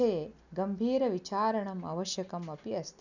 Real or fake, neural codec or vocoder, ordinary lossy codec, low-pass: real; none; none; 7.2 kHz